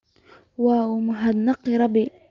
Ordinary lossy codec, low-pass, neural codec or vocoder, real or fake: Opus, 24 kbps; 7.2 kHz; none; real